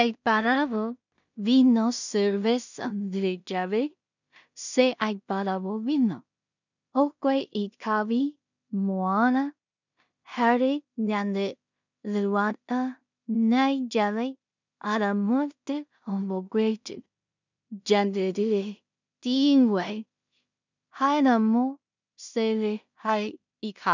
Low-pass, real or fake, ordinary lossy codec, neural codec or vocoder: 7.2 kHz; fake; none; codec, 16 kHz in and 24 kHz out, 0.4 kbps, LongCat-Audio-Codec, two codebook decoder